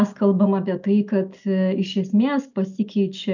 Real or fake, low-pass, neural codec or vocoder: real; 7.2 kHz; none